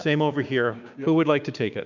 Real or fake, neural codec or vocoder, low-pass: fake; codec, 16 kHz, 6 kbps, DAC; 7.2 kHz